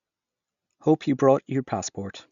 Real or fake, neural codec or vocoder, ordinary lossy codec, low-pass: real; none; none; 7.2 kHz